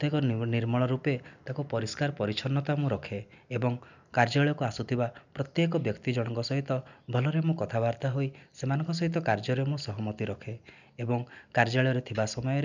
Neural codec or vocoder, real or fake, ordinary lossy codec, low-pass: none; real; none; 7.2 kHz